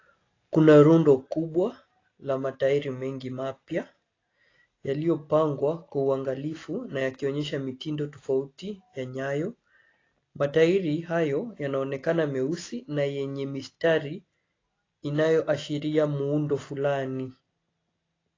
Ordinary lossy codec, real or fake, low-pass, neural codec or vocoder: AAC, 32 kbps; real; 7.2 kHz; none